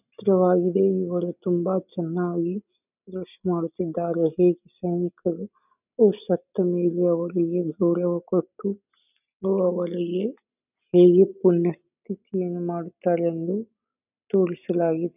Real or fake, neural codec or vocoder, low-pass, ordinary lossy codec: real; none; 3.6 kHz; none